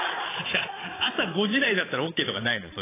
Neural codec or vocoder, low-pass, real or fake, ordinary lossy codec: vocoder, 22.05 kHz, 80 mel bands, Vocos; 3.6 kHz; fake; AAC, 16 kbps